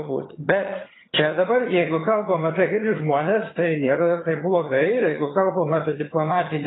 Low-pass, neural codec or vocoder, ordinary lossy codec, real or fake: 7.2 kHz; vocoder, 22.05 kHz, 80 mel bands, HiFi-GAN; AAC, 16 kbps; fake